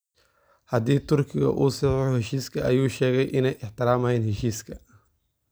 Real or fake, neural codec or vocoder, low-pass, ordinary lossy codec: real; none; none; none